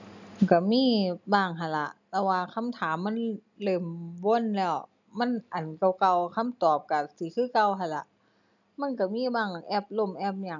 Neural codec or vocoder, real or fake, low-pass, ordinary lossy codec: none; real; 7.2 kHz; none